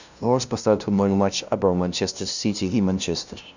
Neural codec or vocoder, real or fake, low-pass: codec, 16 kHz, 0.5 kbps, FunCodec, trained on LibriTTS, 25 frames a second; fake; 7.2 kHz